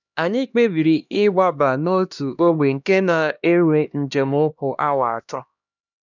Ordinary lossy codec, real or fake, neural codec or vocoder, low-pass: none; fake; codec, 16 kHz, 1 kbps, X-Codec, HuBERT features, trained on LibriSpeech; 7.2 kHz